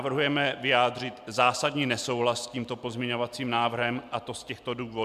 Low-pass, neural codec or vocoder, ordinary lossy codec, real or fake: 14.4 kHz; none; MP3, 96 kbps; real